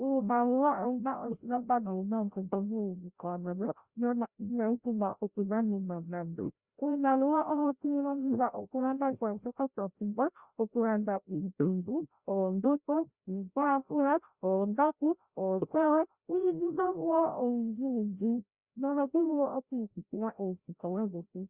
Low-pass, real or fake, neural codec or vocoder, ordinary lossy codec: 3.6 kHz; fake; codec, 16 kHz, 0.5 kbps, FreqCodec, larger model; Opus, 64 kbps